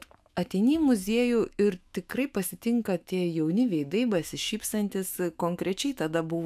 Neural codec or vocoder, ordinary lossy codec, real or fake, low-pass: autoencoder, 48 kHz, 128 numbers a frame, DAC-VAE, trained on Japanese speech; AAC, 96 kbps; fake; 14.4 kHz